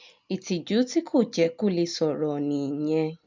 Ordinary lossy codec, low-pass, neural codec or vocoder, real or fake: MP3, 64 kbps; 7.2 kHz; none; real